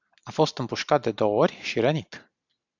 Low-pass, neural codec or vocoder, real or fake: 7.2 kHz; none; real